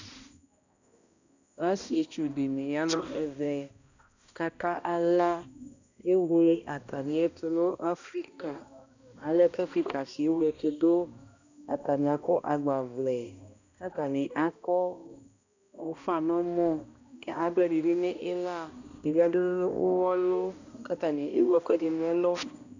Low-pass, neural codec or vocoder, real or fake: 7.2 kHz; codec, 16 kHz, 1 kbps, X-Codec, HuBERT features, trained on balanced general audio; fake